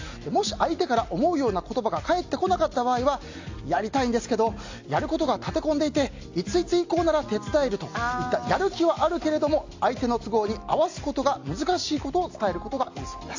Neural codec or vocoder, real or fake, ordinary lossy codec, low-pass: none; real; AAC, 48 kbps; 7.2 kHz